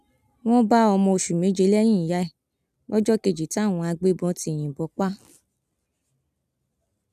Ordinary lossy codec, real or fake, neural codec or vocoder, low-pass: none; real; none; 14.4 kHz